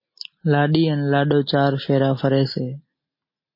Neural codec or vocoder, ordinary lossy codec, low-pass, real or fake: none; MP3, 24 kbps; 5.4 kHz; real